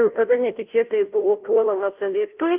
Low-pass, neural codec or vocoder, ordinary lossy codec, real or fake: 3.6 kHz; codec, 16 kHz, 0.5 kbps, FunCodec, trained on Chinese and English, 25 frames a second; Opus, 24 kbps; fake